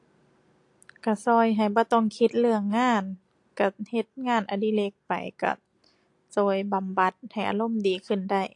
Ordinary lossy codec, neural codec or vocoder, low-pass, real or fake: AAC, 48 kbps; none; 10.8 kHz; real